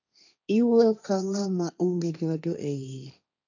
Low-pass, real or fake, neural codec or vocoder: 7.2 kHz; fake; codec, 16 kHz, 1.1 kbps, Voila-Tokenizer